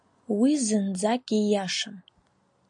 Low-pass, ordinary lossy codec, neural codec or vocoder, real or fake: 9.9 kHz; AAC, 64 kbps; none; real